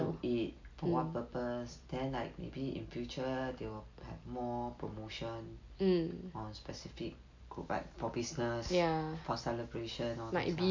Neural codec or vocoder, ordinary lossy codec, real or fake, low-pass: none; MP3, 48 kbps; real; 7.2 kHz